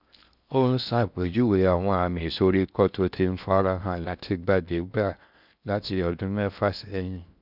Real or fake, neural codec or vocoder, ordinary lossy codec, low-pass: fake; codec, 16 kHz in and 24 kHz out, 0.6 kbps, FocalCodec, streaming, 4096 codes; none; 5.4 kHz